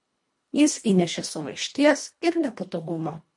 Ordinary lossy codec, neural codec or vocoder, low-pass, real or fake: MP3, 48 kbps; codec, 24 kHz, 1.5 kbps, HILCodec; 10.8 kHz; fake